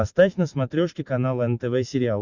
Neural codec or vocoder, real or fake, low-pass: none; real; 7.2 kHz